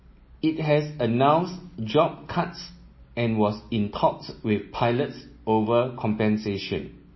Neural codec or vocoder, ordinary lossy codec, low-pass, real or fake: none; MP3, 24 kbps; 7.2 kHz; real